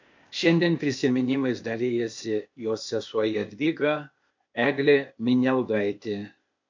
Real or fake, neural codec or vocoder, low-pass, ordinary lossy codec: fake; codec, 16 kHz, 0.8 kbps, ZipCodec; 7.2 kHz; MP3, 48 kbps